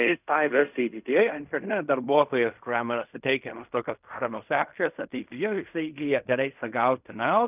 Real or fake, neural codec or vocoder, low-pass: fake; codec, 16 kHz in and 24 kHz out, 0.4 kbps, LongCat-Audio-Codec, fine tuned four codebook decoder; 3.6 kHz